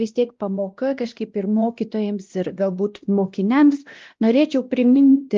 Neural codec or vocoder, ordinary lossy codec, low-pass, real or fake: codec, 16 kHz, 1 kbps, X-Codec, WavLM features, trained on Multilingual LibriSpeech; Opus, 32 kbps; 7.2 kHz; fake